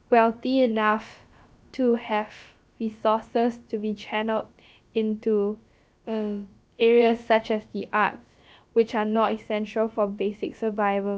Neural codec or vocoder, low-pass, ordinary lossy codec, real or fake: codec, 16 kHz, about 1 kbps, DyCAST, with the encoder's durations; none; none; fake